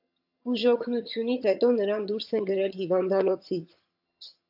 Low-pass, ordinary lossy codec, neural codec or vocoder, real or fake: 5.4 kHz; AAC, 48 kbps; vocoder, 22.05 kHz, 80 mel bands, HiFi-GAN; fake